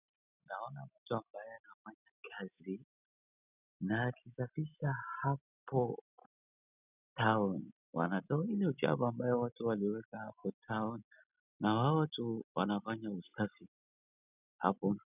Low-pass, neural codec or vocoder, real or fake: 3.6 kHz; none; real